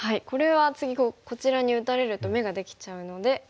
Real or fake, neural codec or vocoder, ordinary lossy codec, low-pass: real; none; none; none